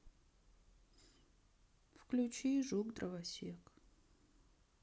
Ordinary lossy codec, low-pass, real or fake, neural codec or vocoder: none; none; real; none